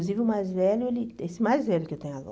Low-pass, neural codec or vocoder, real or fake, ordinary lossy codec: none; none; real; none